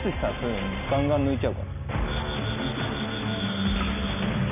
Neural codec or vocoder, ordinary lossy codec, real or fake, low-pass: none; none; real; 3.6 kHz